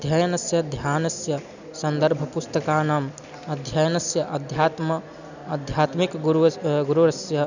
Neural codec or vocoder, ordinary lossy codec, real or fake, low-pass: none; none; real; 7.2 kHz